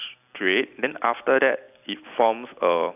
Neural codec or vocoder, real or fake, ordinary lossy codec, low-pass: none; real; AAC, 32 kbps; 3.6 kHz